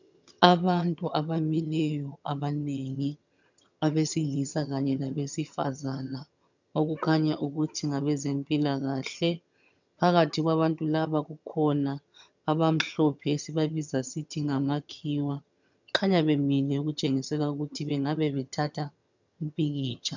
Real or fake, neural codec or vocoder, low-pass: fake; vocoder, 22.05 kHz, 80 mel bands, HiFi-GAN; 7.2 kHz